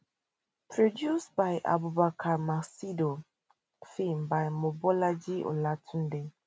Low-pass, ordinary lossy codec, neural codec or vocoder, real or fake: none; none; none; real